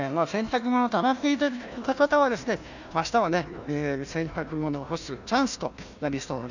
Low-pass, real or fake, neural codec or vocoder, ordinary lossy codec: 7.2 kHz; fake; codec, 16 kHz, 1 kbps, FunCodec, trained on Chinese and English, 50 frames a second; none